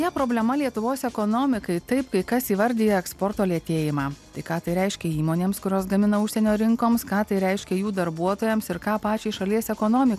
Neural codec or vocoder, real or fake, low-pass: none; real; 14.4 kHz